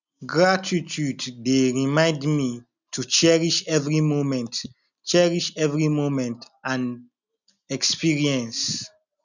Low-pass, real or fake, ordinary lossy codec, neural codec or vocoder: 7.2 kHz; real; none; none